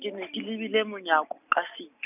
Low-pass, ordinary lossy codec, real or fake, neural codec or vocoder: 3.6 kHz; none; real; none